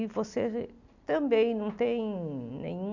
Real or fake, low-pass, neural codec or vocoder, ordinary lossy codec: real; 7.2 kHz; none; none